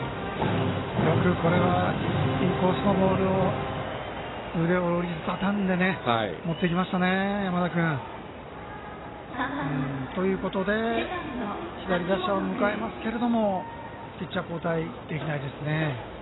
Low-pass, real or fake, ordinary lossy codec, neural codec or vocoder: 7.2 kHz; real; AAC, 16 kbps; none